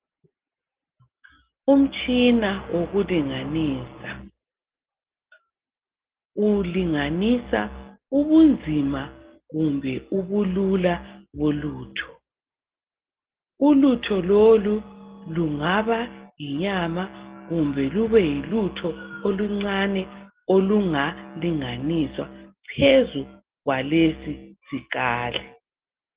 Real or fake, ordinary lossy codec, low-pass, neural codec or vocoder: real; Opus, 16 kbps; 3.6 kHz; none